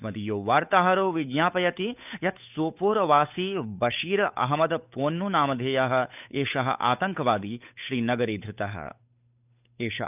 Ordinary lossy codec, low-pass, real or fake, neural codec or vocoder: none; 3.6 kHz; fake; codec, 16 kHz, 8 kbps, FunCodec, trained on Chinese and English, 25 frames a second